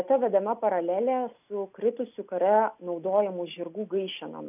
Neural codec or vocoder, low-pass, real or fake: none; 3.6 kHz; real